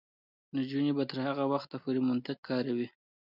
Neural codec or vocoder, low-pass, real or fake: none; 5.4 kHz; real